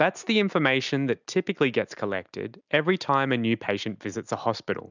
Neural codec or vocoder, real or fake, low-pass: none; real; 7.2 kHz